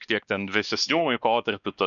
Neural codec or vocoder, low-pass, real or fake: codec, 16 kHz, 2 kbps, X-Codec, WavLM features, trained on Multilingual LibriSpeech; 7.2 kHz; fake